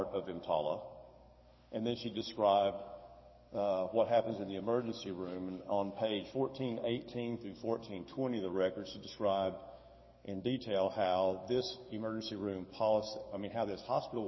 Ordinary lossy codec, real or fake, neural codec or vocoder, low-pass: MP3, 24 kbps; fake; codec, 44.1 kHz, 7.8 kbps, DAC; 7.2 kHz